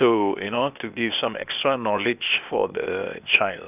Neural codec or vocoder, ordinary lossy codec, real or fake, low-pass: codec, 16 kHz, 0.8 kbps, ZipCodec; none; fake; 3.6 kHz